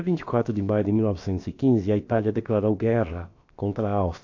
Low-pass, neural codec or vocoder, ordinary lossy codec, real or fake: 7.2 kHz; codec, 16 kHz, about 1 kbps, DyCAST, with the encoder's durations; AAC, 48 kbps; fake